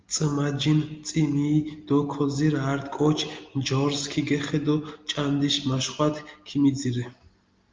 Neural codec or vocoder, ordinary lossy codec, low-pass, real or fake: none; Opus, 24 kbps; 7.2 kHz; real